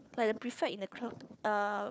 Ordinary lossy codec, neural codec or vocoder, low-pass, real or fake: none; codec, 16 kHz, 4 kbps, FunCodec, trained on Chinese and English, 50 frames a second; none; fake